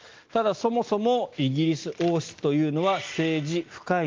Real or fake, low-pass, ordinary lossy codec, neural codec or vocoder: real; 7.2 kHz; Opus, 32 kbps; none